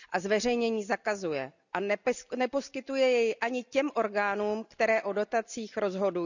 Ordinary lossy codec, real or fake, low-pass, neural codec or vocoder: none; real; 7.2 kHz; none